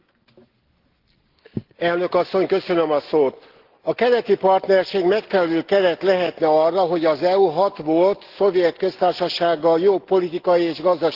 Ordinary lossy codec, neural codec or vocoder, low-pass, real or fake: Opus, 16 kbps; none; 5.4 kHz; real